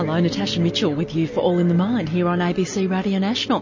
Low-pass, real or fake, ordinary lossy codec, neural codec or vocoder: 7.2 kHz; real; MP3, 32 kbps; none